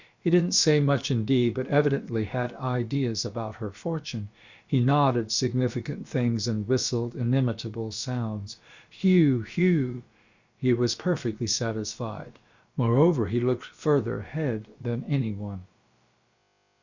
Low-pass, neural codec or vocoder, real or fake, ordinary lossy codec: 7.2 kHz; codec, 16 kHz, about 1 kbps, DyCAST, with the encoder's durations; fake; Opus, 64 kbps